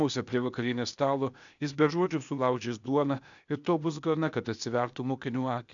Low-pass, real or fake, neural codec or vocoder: 7.2 kHz; fake; codec, 16 kHz, 0.8 kbps, ZipCodec